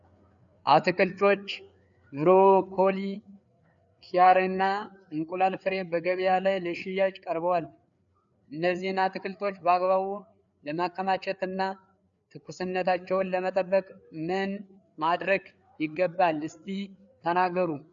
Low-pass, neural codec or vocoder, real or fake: 7.2 kHz; codec, 16 kHz, 4 kbps, FreqCodec, larger model; fake